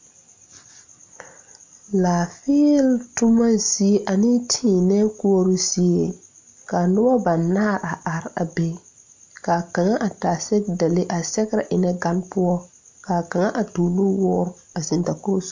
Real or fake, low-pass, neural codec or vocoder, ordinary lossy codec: real; 7.2 kHz; none; MP3, 48 kbps